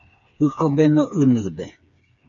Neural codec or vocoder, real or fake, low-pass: codec, 16 kHz, 4 kbps, FreqCodec, smaller model; fake; 7.2 kHz